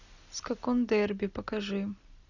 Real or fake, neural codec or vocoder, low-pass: real; none; 7.2 kHz